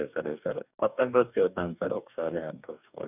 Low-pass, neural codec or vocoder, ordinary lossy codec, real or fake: 3.6 kHz; codec, 44.1 kHz, 2.6 kbps, DAC; none; fake